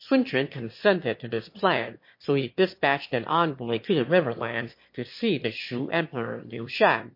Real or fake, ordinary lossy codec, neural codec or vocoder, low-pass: fake; MP3, 32 kbps; autoencoder, 22.05 kHz, a latent of 192 numbers a frame, VITS, trained on one speaker; 5.4 kHz